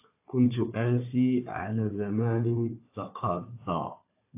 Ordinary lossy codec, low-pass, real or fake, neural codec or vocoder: AAC, 24 kbps; 3.6 kHz; fake; codec, 16 kHz, 4 kbps, FunCodec, trained on Chinese and English, 50 frames a second